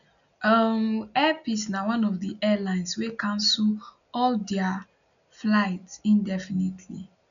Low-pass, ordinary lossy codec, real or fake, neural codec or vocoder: 7.2 kHz; none; real; none